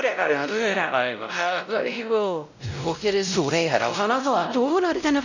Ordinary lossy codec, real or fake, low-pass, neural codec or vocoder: none; fake; 7.2 kHz; codec, 16 kHz, 0.5 kbps, X-Codec, WavLM features, trained on Multilingual LibriSpeech